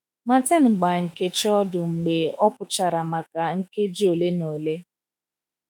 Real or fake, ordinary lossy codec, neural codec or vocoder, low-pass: fake; none; autoencoder, 48 kHz, 32 numbers a frame, DAC-VAE, trained on Japanese speech; none